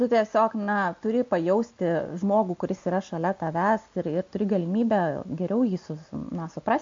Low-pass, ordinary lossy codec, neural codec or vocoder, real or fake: 7.2 kHz; MP3, 48 kbps; none; real